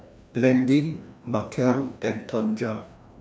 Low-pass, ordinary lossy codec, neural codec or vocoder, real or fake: none; none; codec, 16 kHz, 1 kbps, FreqCodec, larger model; fake